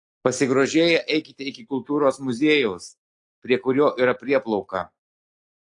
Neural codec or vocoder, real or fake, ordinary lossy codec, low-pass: none; real; AAC, 48 kbps; 10.8 kHz